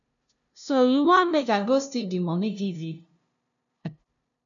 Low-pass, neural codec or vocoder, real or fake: 7.2 kHz; codec, 16 kHz, 0.5 kbps, FunCodec, trained on LibriTTS, 25 frames a second; fake